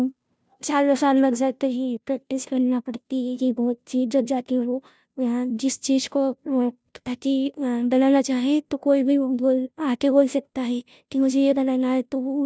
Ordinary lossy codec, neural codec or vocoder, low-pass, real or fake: none; codec, 16 kHz, 0.5 kbps, FunCodec, trained on Chinese and English, 25 frames a second; none; fake